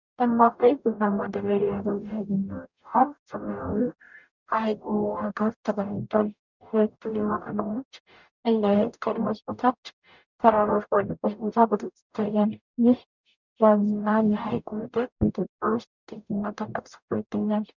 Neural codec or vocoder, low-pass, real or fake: codec, 44.1 kHz, 0.9 kbps, DAC; 7.2 kHz; fake